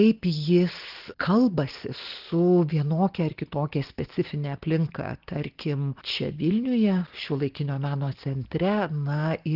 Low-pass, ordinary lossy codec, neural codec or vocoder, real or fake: 5.4 kHz; Opus, 16 kbps; none; real